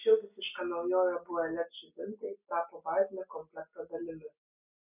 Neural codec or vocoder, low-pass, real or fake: none; 3.6 kHz; real